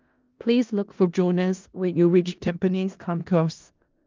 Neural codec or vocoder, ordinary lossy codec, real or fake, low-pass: codec, 16 kHz in and 24 kHz out, 0.4 kbps, LongCat-Audio-Codec, four codebook decoder; Opus, 24 kbps; fake; 7.2 kHz